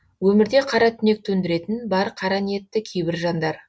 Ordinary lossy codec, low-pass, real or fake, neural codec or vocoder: none; none; real; none